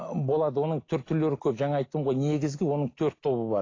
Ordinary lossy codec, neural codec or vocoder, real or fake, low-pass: AAC, 32 kbps; none; real; 7.2 kHz